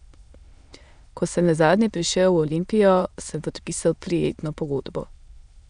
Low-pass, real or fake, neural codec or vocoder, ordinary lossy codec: 9.9 kHz; fake; autoencoder, 22.05 kHz, a latent of 192 numbers a frame, VITS, trained on many speakers; none